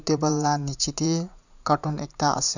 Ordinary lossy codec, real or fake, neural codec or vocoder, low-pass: none; fake; vocoder, 44.1 kHz, 80 mel bands, Vocos; 7.2 kHz